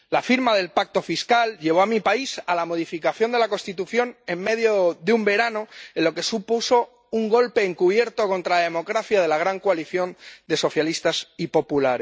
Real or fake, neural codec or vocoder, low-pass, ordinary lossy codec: real; none; none; none